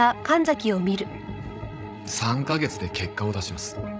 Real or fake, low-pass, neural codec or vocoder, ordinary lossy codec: fake; none; codec, 16 kHz, 16 kbps, FreqCodec, larger model; none